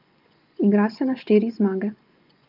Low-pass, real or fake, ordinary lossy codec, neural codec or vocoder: 5.4 kHz; fake; Opus, 24 kbps; vocoder, 24 kHz, 100 mel bands, Vocos